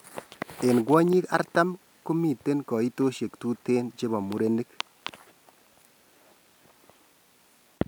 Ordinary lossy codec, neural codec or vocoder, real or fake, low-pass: none; none; real; none